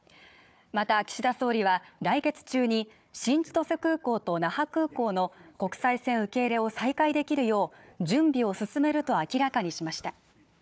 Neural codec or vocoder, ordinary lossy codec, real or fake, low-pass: codec, 16 kHz, 4 kbps, FunCodec, trained on Chinese and English, 50 frames a second; none; fake; none